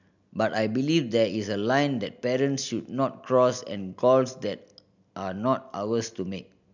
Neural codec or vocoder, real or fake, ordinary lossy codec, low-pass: none; real; none; 7.2 kHz